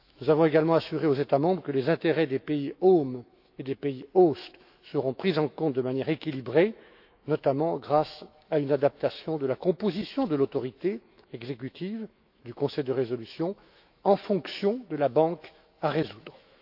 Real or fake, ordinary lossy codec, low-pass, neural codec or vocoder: fake; AAC, 48 kbps; 5.4 kHz; autoencoder, 48 kHz, 128 numbers a frame, DAC-VAE, trained on Japanese speech